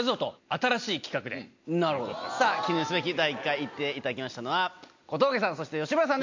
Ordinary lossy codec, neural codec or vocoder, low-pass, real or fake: MP3, 48 kbps; none; 7.2 kHz; real